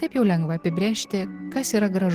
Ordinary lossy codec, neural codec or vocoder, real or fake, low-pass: Opus, 16 kbps; none; real; 14.4 kHz